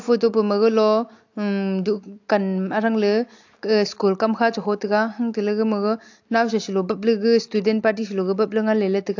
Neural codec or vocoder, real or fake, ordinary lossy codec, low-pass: none; real; AAC, 48 kbps; 7.2 kHz